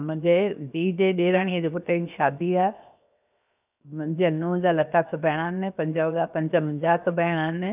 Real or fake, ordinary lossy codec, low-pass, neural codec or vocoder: fake; none; 3.6 kHz; codec, 16 kHz, 0.7 kbps, FocalCodec